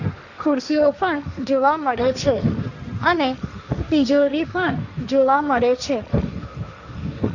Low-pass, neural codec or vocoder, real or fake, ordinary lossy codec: 7.2 kHz; codec, 16 kHz, 1.1 kbps, Voila-Tokenizer; fake; none